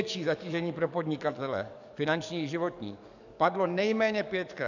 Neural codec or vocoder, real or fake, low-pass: codec, 44.1 kHz, 7.8 kbps, DAC; fake; 7.2 kHz